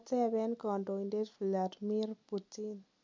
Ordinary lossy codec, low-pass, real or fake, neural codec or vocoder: MP3, 48 kbps; 7.2 kHz; real; none